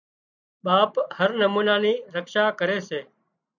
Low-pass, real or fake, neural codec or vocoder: 7.2 kHz; real; none